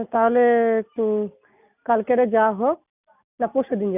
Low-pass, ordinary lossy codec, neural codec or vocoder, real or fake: 3.6 kHz; none; none; real